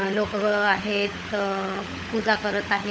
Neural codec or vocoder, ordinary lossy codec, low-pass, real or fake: codec, 16 kHz, 16 kbps, FunCodec, trained on LibriTTS, 50 frames a second; none; none; fake